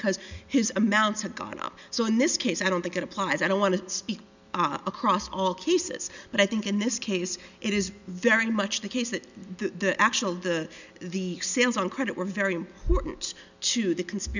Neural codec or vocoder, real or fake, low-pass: none; real; 7.2 kHz